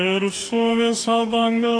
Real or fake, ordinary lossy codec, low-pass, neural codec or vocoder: fake; AAC, 48 kbps; 9.9 kHz; codec, 44.1 kHz, 2.6 kbps, DAC